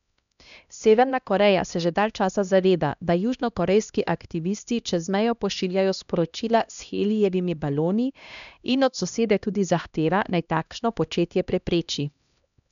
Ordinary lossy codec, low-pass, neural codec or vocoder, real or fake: none; 7.2 kHz; codec, 16 kHz, 1 kbps, X-Codec, HuBERT features, trained on LibriSpeech; fake